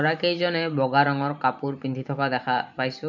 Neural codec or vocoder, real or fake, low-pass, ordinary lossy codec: vocoder, 44.1 kHz, 128 mel bands every 512 samples, BigVGAN v2; fake; 7.2 kHz; none